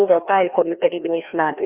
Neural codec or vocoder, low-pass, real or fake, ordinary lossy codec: codec, 16 kHz, 1 kbps, FreqCodec, larger model; 3.6 kHz; fake; Opus, 64 kbps